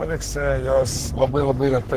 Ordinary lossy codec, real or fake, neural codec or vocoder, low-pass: Opus, 16 kbps; fake; codec, 44.1 kHz, 3.4 kbps, Pupu-Codec; 14.4 kHz